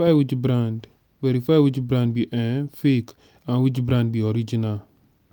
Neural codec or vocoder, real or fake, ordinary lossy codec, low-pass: none; real; none; none